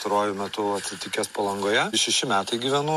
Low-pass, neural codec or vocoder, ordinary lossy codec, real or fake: 14.4 kHz; none; MP3, 64 kbps; real